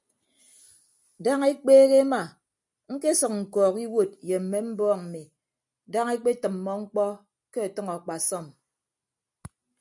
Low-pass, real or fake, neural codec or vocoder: 10.8 kHz; real; none